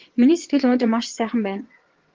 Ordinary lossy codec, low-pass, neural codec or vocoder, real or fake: Opus, 16 kbps; 7.2 kHz; vocoder, 22.05 kHz, 80 mel bands, WaveNeXt; fake